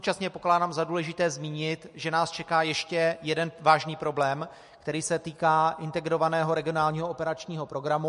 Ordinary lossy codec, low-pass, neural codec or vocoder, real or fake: MP3, 48 kbps; 10.8 kHz; none; real